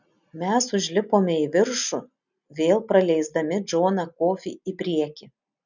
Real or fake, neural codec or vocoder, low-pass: real; none; 7.2 kHz